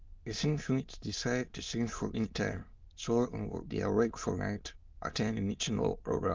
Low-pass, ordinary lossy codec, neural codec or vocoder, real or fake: 7.2 kHz; Opus, 32 kbps; autoencoder, 22.05 kHz, a latent of 192 numbers a frame, VITS, trained on many speakers; fake